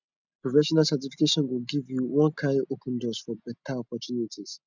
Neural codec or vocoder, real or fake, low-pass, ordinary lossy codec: none; real; 7.2 kHz; none